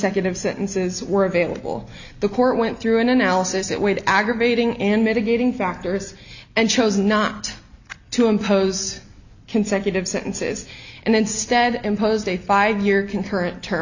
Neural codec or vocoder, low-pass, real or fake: none; 7.2 kHz; real